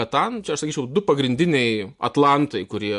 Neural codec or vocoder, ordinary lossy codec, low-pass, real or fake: none; MP3, 64 kbps; 10.8 kHz; real